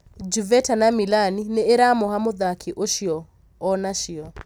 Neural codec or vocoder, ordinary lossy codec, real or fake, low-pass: none; none; real; none